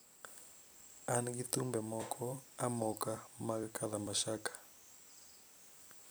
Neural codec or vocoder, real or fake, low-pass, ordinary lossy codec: vocoder, 44.1 kHz, 128 mel bands every 256 samples, BigVGAN v2; fake; none; none